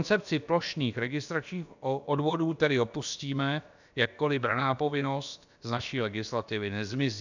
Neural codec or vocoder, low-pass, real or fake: codec, 16 kHz, about 1 kbps, DyCAST, with the encoder's durations; 7.2 kHz; fake